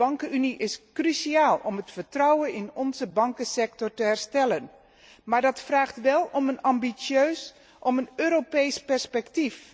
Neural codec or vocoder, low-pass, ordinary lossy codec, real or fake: none; none; none; real